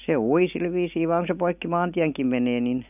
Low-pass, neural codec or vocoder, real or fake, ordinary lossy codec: 3.6 kHz; none; real; none